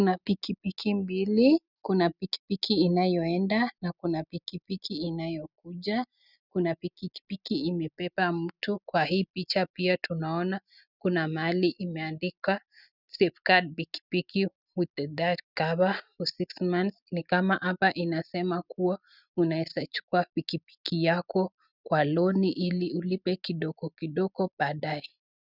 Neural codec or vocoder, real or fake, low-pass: none; real; 5.4 kHz